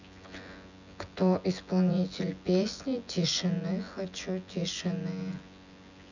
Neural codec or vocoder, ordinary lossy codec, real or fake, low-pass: vocoder, 24 kHz, 100 mel bands, Vocos; none; fake; 7.2 kHz